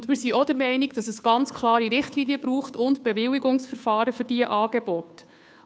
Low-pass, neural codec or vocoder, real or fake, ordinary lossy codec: none; codec, 16 kHz, 2 kbps, FunCodec, trained on Chinese and English, 25 frames a second; fake; none